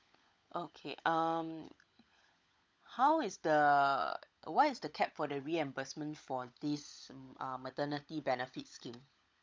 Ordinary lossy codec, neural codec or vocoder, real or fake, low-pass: none; codec, 16 kHz, 16 kbps, FunCodec, trained on LibriTTS, 50 frames a second; fake; none